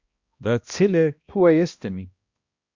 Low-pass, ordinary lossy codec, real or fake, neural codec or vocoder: 7.2 kHz; Opus, 64 kbps; fake; codec, 16 kHz, 1 kbps, X-Codec, HuBERT features, trained on balanced general audio